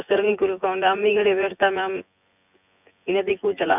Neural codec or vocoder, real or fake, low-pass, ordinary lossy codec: vocoder, 24 kHz, 100 mel bands, Vocos; fake; 3.6 kHz; none